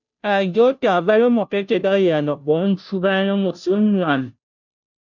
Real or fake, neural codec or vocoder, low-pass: fake; codec, 16 kHz, 0.5 kbps, FunCodec, trained on Chinese and English, 25 frames a second; 7.2 kHz